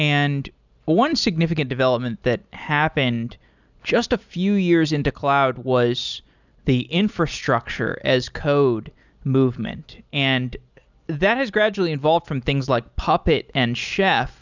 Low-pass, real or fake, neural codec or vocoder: 7.2 kHz; real; none